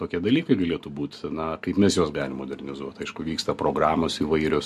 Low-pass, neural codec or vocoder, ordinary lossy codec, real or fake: 14.4 kHz; none; MP3, 64 kbps; real